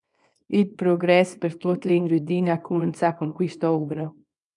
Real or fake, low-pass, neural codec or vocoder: fake; 10.8 kHz; codec, 24 kHz, 0.9 kbps, WavTokenizer, small release